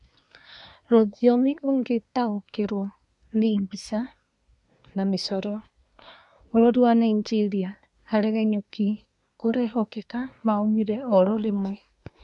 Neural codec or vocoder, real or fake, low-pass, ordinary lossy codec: codec, 24 kHz, 1 kbps, SNAC; fake; 10.8 kHz; none